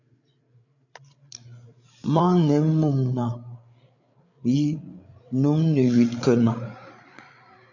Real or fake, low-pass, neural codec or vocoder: fake; 7.2 kHz; codec, 16 kHz, 8 kbps, FreqCodec, larger model